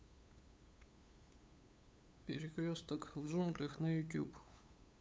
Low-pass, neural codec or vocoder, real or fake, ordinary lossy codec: none; codec, 16 kHz, 8 kbps, FunCodec, trained on LibriTTS, 25 frames a second; fake; none